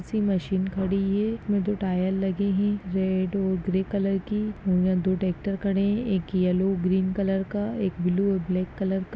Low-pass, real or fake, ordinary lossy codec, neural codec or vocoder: none; real; none; none